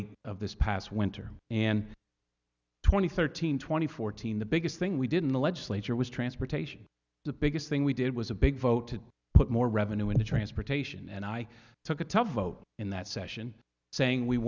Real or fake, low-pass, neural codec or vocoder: real; 7.2 kHz; none